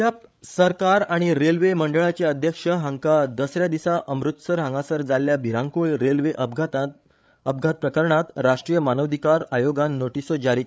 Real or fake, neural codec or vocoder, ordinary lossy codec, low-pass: fake; codec, 16 kHz, 8 kbps, FreqCodec, larger model; none; none